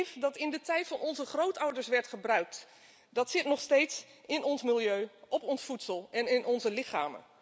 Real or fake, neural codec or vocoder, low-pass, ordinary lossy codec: real; none; none; none